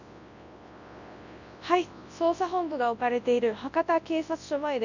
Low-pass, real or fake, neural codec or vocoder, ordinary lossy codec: 7.2 kHz; fake; codec, 24 kHz, 0.9 kbps, WavTokenizer, large speech release; none